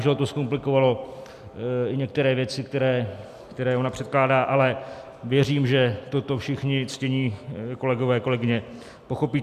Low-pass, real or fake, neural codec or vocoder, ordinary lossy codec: 14.4 kHz; real; none; MP3, 96 kbps